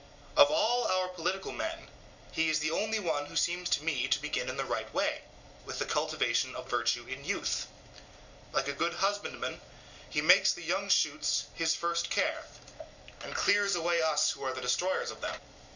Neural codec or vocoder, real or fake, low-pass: none; real; 7.2 kHz